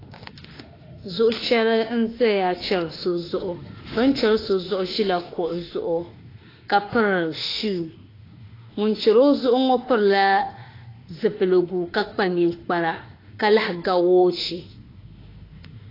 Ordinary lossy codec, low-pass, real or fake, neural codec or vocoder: AAC, 24 kbps; 5.4 kHz; fake; autoencoder, 48 kHz, 32 numbers a frame, DAC-VAE, trained on Japanese speech